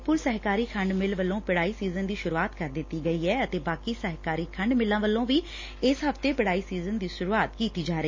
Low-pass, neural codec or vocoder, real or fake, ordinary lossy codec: 7.2 kHz; none; real; MP3, 32 kbps